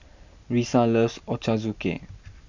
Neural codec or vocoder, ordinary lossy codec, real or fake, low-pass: none; none; real; 7.2 kHz